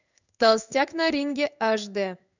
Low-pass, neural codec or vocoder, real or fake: 7.2 kHz; codec, 16 kHz in and 24 kHz out, 1 kbps, XY-Tokenizer; fake